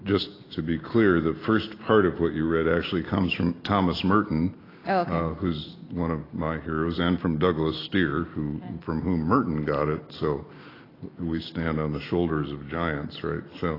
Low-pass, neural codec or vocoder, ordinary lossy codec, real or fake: 5.4 kHz; none; AAC, 24 kbps; real